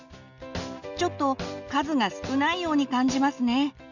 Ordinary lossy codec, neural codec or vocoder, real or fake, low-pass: Opus, 64 kbps; none; real; 7.2 kHz